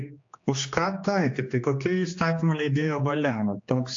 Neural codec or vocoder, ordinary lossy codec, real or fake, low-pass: codec, 16 kHz, 2 kbps, X-Codec, HuBERT features, trained on general audio; AAC, 48 kbps; fake; 7.2 kHz